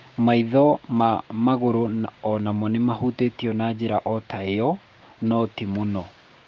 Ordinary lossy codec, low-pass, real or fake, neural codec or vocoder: Opus, 24 kbps; 7.2 kHz; fake; codec, 16 kHz, 6 kbps, DAC